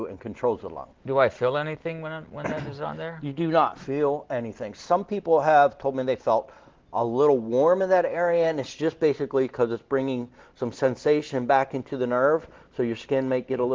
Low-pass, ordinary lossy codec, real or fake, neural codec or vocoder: 7.2 kHz; Opus, 16 kbps; real; none